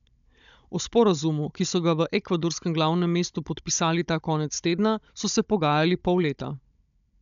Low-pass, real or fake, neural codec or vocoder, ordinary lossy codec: 7.2 kHz; fake; codec, 16 kHz, 16 kbps, FunCodec, trained on Chinese and English, 50 frames a second; MP3, 96 kbps